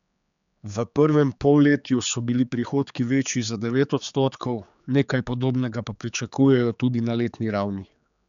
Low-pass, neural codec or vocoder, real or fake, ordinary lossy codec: 7.2 kHz; codec, 16 kHz, 4 kbps, X-Codec, HuBERT features, trained on general audio; fake; none